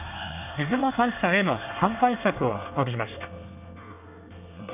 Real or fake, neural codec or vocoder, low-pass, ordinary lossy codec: fake; codec, 24 kHz, 1 kbps, SNAC; 3.6 kHz; none